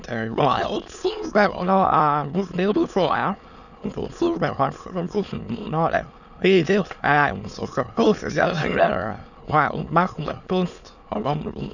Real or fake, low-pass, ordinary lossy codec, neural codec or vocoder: fake; 7.2 kHz; none; autoencoder, 22.05 kHz, a latent of 192 numbers a frame, VITS, trained on many speakers